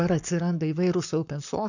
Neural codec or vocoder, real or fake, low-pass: codec, 44.1 kHz, 7.8 kbps, Pupu-Codec; fake; 7.2 kHz